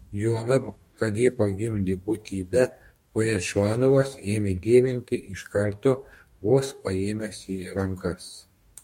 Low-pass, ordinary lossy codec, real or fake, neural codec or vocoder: 19.8 kHz; MP3, 64 kbps; fake; codec, 44.1 kHz, 2.6 kbps, DAC